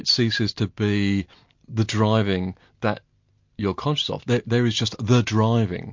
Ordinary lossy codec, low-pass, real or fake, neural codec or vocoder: MP3, 48 kbps; 7.2 kHz; real; none